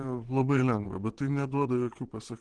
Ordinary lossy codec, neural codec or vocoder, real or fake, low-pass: Opus, 16 kbps; vocoder, 22.05 kHz, 80 mel bands, WaveNeXt; fake; 9.9 kHz